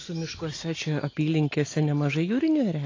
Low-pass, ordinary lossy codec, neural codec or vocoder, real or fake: 7.2 kHz; AAC, 32 kbps; none; real